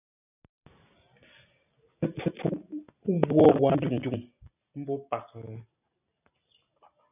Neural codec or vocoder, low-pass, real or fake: none; 3.6 kHz; real